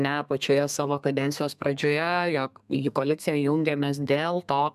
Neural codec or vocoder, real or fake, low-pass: codec, 32 kHz, 1.9 kbps, SNAC; fake; 14.4 kHz